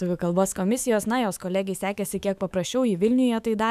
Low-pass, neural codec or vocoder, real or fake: 14.4 kHz; autoencoder, 48 kHz, 128 numbers a frame, DAC-VAE, trained on Japanese speech; fake